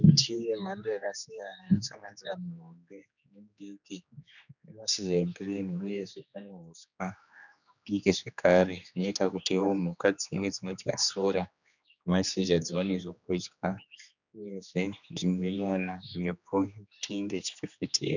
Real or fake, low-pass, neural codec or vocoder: fake; 7.2 kHz; codec, 16 kHz, 2 kbps, X-Codec, HuBERT features, trained on general audio